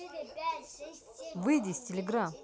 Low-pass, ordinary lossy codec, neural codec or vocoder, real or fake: none; none; none; real